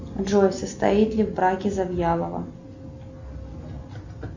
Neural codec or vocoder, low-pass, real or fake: none; 7.2 kHz; real